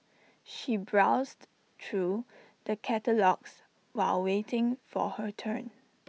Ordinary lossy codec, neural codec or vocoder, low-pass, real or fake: none; none; none; real